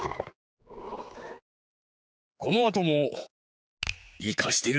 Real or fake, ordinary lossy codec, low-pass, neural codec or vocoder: fake; none; none; codec, 16 kHz, 4 kbps, X-Codec, HuBERT features, trained on general audio